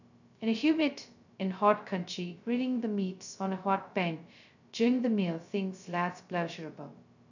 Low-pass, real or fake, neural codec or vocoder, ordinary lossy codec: 7.2 kHz; fake; codec, 16 kHz, 0.2 kbps, FocalCodec; none